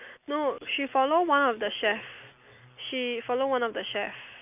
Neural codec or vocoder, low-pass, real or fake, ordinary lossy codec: none; 3.6 kHz; real; none